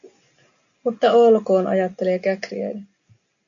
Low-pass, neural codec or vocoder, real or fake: 7.2 kHz; none; real